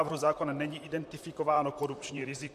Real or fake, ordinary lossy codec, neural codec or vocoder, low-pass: fake; MP3, 64 kbps; vocoder, 44.1 kHz, 128 mel bands, Pupu-Vocoder; 14.4 kHz